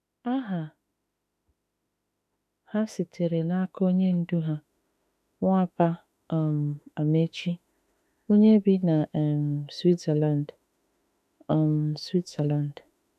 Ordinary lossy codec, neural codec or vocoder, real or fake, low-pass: none; autoencoder, 48 kHz, 32 numbers a frame, DAC-VAE, trained on Japanese speech; fake; 14.4 kHz